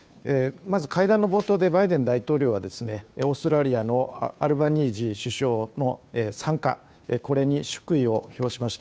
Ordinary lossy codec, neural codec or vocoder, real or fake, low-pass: none; codec, 16 kHz, 2 kbps, FunCodec, trained on Chinese and English, 25 frames a second; fake; none